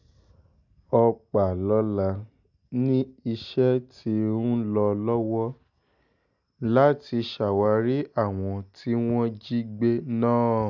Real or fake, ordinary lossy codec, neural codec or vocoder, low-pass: real; none; none; 7.2 kHz